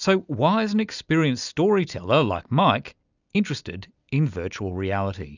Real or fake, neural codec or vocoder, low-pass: real; none; 7.2 kHz